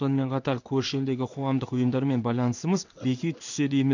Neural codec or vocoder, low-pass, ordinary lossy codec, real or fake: codec, 16 kHz in and 24 kHz out, 1 kbps, XY-Tokenizer; 7.2 kHz; none; fake